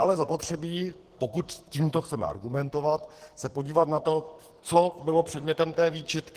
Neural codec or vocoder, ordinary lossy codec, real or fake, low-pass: codec, 44.1 kHz, 2.6 kbps, SNAC; Opus, 16 kbps; fake; 14.4 kHz